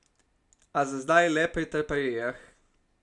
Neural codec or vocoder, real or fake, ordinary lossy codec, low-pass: none; real; none; 10.8 kHz